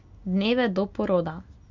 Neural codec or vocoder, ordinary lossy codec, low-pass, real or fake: none; Opus, 64 kbps; 7.2 kHz; real